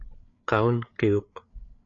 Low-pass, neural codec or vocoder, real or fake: 7.2 kHz; codec, 16 kHz, 8 kbps, FreqCodec, larger model; fake